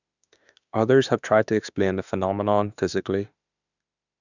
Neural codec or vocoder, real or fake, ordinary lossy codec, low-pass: autoencoder, 48 kHz, 32 numbers a frame, DAC-VAE, trained on Japanese speech; fake; none; 7.2 kHz